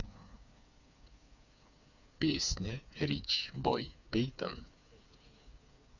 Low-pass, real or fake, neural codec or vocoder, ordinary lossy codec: 7.2 kHz; fake; codec, 16 kHz, 4 kbps, FreqCodec, smaller model; none